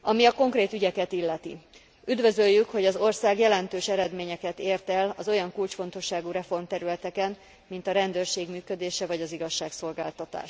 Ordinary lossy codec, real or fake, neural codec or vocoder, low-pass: none; real; none; none